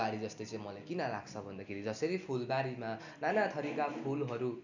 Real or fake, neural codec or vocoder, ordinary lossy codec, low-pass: real; none; none; 7.2 kHz